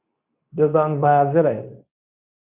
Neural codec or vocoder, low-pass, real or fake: codec, 24 kHz, 0.9 kbps, WavTokenizer, medium speech release version 2; 3.6 kHz; fake